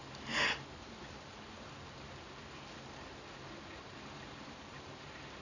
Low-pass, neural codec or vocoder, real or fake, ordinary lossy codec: 7.2 kHz; none; real; none